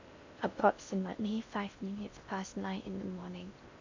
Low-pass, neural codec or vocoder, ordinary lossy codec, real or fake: 7.2 kHz; codec, 16 kHz in and 24 kHz out, 0.6 kbps, FocalCodec, streaming, 4096 codes; AAC, 48 kbps; fake